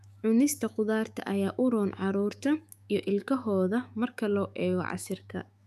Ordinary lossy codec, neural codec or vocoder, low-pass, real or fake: none; autoencoder, 48 kHz, 128 numbers a frame, DAC-VAE, trained on Japanese speech; 14.4 kHz; fake